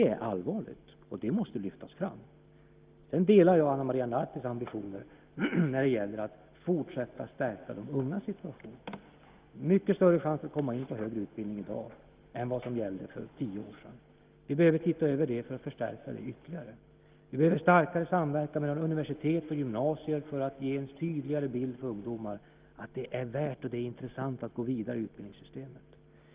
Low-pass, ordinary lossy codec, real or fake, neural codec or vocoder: 3.6 kHz; Opus, 24 kbps; real; none